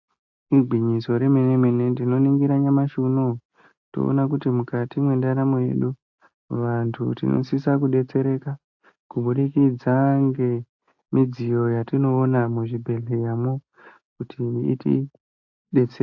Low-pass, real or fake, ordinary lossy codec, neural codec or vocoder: 7.2 kHz; real; AAC, 48 kbps; none